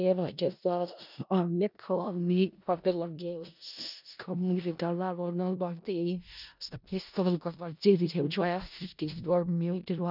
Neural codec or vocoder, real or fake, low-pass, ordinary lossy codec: codec, 16 kHz in and 24 kHz out, 0.4 kbps, LongCat-Audio-Codec, four codebook decoder; fake; 5.4 kHz; none